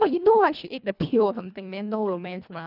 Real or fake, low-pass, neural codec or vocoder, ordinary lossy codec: fake; 5.4 kHz; codec, 24 kHz, 1.5 kbps, HILCodec; none